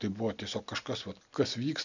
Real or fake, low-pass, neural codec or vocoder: real; 7.2 kHz; none